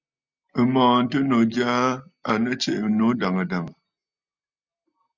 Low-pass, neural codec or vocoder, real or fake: 7.2 kHz; none; real